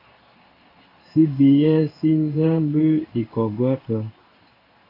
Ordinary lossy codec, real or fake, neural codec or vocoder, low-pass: AAC, 32 kbps; fake; codec, 16 kHz in and 24 kHz out, 1 kbps, XY-Tokenizer; 5.4 kHz